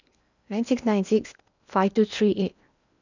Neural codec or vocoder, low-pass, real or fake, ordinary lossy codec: codec, 16 kHz in and 24 kHz out, 0.8 kbps, FocalCodec, streaming, 65536 codes; 7.2 kHz; fake; none